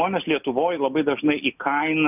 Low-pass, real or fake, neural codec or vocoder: 3.6 kHz; real; none